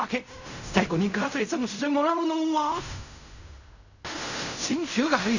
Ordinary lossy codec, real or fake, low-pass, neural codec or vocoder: none; fake; 7.2 kHz; codec, 16 kHz in and 24 kHz out, 0.4 kbps, LongCat-Audio-Codec, fine tuned four codebook decoder